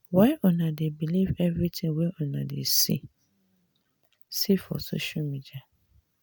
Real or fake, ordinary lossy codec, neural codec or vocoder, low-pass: real; none; none; none